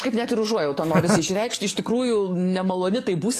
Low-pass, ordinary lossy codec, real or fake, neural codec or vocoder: 14.4 kHz; AAC, 48 kbps; fake; codec, 44.1 kHz, 7.8 kbps, DAC